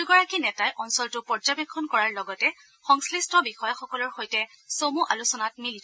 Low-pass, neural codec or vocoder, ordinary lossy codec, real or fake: none; none; none; real